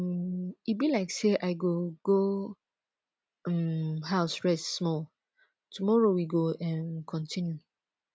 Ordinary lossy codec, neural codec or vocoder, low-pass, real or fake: none; none; none; real